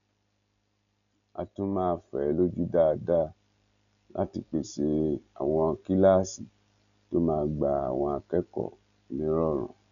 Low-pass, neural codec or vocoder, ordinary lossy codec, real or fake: 7.2 kHz; none; none; real